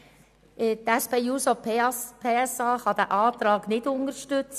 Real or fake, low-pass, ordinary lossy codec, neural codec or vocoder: real; 14.4 kHz; none; none